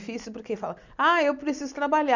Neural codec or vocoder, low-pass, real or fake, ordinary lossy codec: none; 7.2 kHz; real; none